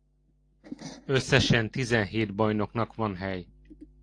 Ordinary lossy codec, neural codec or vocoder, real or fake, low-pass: AAC, 48 kbps; none; real; 9.9 kHz